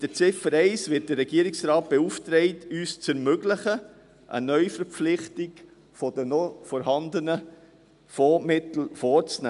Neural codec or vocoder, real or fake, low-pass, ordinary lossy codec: none; real; 10.8 kHz; none